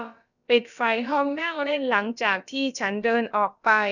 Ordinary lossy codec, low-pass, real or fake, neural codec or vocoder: none; 7.2 kHz; fake; codec, 16 kHz, about 1 kbps, DyCAST, with the encoder's durations